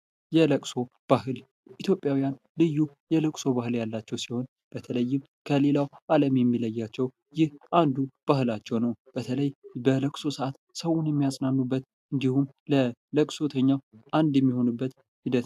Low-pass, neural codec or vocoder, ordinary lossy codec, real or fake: 14.4 kHz; none; AAC, 96 kbps; real